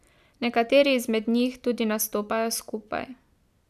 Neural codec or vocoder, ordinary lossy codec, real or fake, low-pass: none; none; real; 14.4 kHz